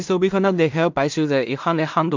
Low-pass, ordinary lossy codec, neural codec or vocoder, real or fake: 7.2 kHz; MP3, 64 kbps; codec, 16 kHz in and 24 kHz out, 0.4 kbps, LongCat-Audio-Codec, two codebook decoder; fake